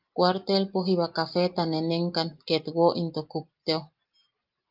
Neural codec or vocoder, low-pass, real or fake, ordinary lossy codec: none; 5.4 kHz; real; Opus, 24 kbps